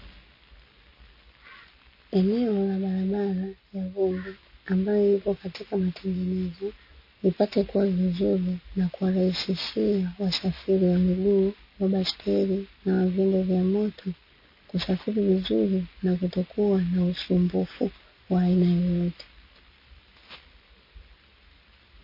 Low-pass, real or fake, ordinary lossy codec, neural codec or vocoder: 5.4 kHz; real; MP3, 24 kbps; none